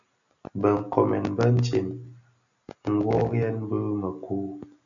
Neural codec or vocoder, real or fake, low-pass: none; real; 7.2 kHz